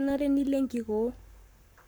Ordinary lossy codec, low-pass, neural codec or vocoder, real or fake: none; none; codec, 44.1 kHz, 7.8 kbps, Pupu-Codec; fake